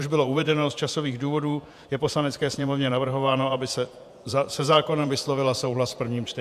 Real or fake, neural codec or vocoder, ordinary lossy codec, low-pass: fake; vocoder, 48 kHz, 128 mel bands, Vocos; AAC, 96 kbps; 14.4 kHz